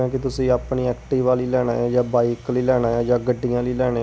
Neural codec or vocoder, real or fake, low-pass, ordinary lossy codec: none; real; none; none